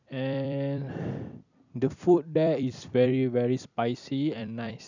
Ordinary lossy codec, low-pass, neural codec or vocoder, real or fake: none; 7.2 kHz; vocoder, 44.1 kHz, 128 mel bands every 256 samples, BigVGAN v2; fake